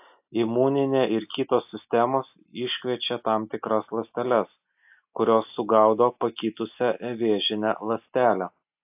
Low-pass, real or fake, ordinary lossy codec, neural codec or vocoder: 3.6 kHz; real; AAC, 32 kbps; none